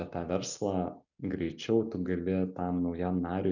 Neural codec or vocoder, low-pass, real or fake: none; 7.2 kHz; real